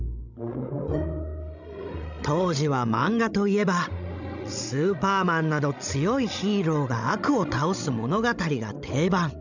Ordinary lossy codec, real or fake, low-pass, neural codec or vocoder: none; fake; 7.2 kHz; codec, 16 kHz, 16 kbps, FreqCodec, larger model